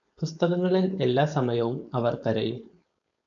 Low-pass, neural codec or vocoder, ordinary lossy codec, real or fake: 7.2 kHz; codec, 16 kHz, 4.8 kbps, FACodec; MP3, 96 kbps; fake